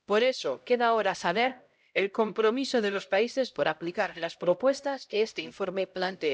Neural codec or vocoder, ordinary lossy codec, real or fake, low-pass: codec, 16 kHz, 0.5 kbps, X-Codec, HuBERT features, trained on LibriSpeech; none; fake; none